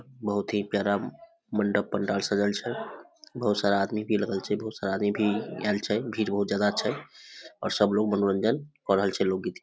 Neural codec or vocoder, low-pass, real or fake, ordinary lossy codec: none; none; real; none